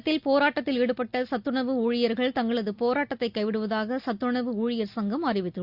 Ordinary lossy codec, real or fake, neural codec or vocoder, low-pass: Opus, 64 kbps; real; none; 5.4 kHz